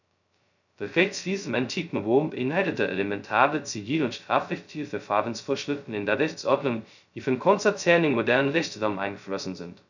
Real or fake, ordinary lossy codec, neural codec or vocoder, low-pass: fake; none; codec, 16 kHz, 0.2 kbps, FocalCodec; 7.2 kHz